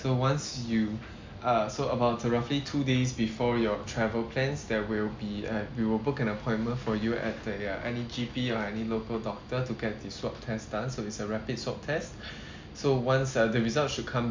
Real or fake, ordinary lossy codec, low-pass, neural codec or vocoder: real; MP3, 64 kbps; 7.2 kHz; none